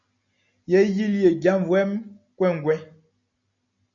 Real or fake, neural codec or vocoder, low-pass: real; none; 7.2 kHz